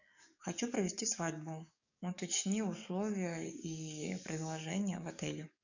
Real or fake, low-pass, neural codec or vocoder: fake; 7.2 kHz; codec, 44.1 kHz, 7.8 kbps, DAC